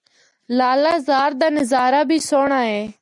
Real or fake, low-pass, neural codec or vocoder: real; 10.8 kHz; none